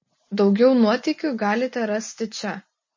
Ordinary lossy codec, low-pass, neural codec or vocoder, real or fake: MP3, 32 kbps; 7.2 kHz; none; real